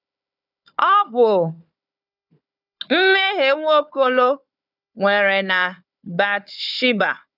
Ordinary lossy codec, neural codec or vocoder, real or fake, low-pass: none; codec, 16 kHz, 4 kbps, FunCodec, trained on Chinese and English, 50 frames a second; fake; 5.4 kHz